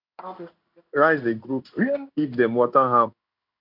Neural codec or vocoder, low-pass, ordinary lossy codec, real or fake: codec, 16 kHz in and 24 kHz out, 1 kbps, XY-Tokenizer; 5.4 kHz; none; fake